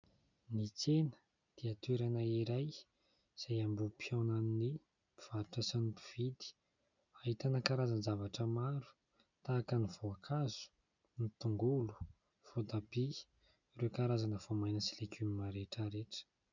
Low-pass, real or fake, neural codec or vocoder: 7.2 kHz; real; none